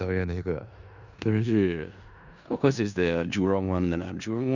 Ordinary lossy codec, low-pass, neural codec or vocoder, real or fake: none; 7.2 kHz; codec, 16 kHz in and 24 kHz out, 0.4 kbps, LongCat-Audio-Codec, four codebook decoder; fake